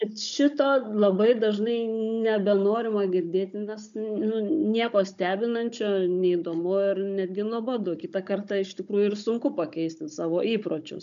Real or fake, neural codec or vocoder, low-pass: fake; codec, 16 kHz, 16 kbps, FunCodec, trained on Chinese and English, 50 frames a second; 7.2 kHz